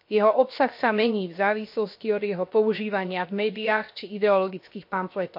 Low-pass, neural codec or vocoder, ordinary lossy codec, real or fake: 5.4 kHz; codec, 16 kHz, 0.7 kbps, FocalCodec; MP3, 32 kbps; fake